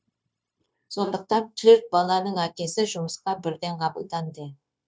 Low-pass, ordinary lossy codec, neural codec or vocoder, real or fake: none; none; codec, 16 kHz, 0.9 kbps, LongCat-Audio-Codec; fake